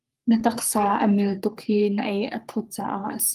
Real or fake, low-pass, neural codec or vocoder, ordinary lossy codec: fake; 14.4 kHz; codec, 44.1 kHz, 3.4 kbps, Pupu-Codec; Opus, 32 kbps